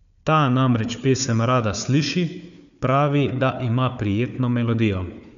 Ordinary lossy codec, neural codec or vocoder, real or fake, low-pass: none; codec, 16 kHz, 4 kbps, FunCodec, trained on Chinese and English, 50 frames a second; fake; 7.2 kHz